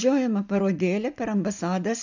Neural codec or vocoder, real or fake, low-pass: none; real; 7.2 kHz